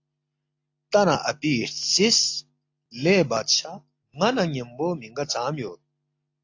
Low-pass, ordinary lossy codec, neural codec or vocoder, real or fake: 7.2 kHz; AAC, 48 kbps; none; real